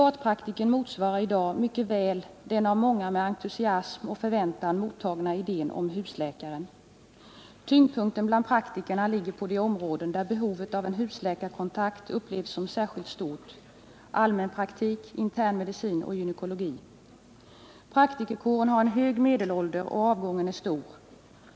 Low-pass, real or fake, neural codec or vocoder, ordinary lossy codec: none; real; none; none